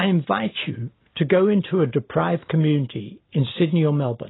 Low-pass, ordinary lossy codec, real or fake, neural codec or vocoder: 7.2 kHz; AAC, 16 kbps; real; none